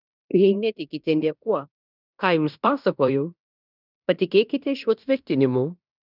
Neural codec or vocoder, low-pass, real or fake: codec, 16 kHz in and 24 kHz out, 0.9 kbps, LongCat-Audio-Codec, fine tuned four codebook decoder; 5.4 kHz; fake